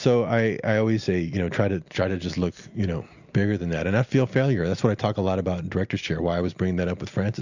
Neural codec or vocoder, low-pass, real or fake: none; 7.2 kHz; real